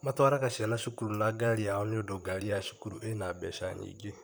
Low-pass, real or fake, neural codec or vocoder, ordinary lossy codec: none; fake; vocoder, 44.1 kHz, 128 mel bands, Pupu-Vocoder; none